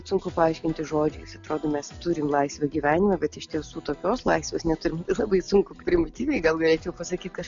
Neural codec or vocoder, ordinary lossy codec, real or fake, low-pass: none; MP3, 64 kbps; real; 7.2 kHz